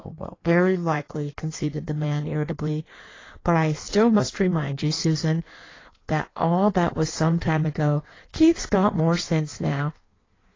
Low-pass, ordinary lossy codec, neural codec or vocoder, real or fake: 7.2 kHz; AAC, 32 kbps; codec, 16 kHz in and 24 kHz out, 1.1 kbps, FireRedTTS-2 codec; fake